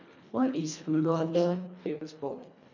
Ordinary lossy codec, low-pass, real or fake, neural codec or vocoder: none; 7.2 kHz; fake; codec, 24 kHz, 1.5 kbps, HILCodec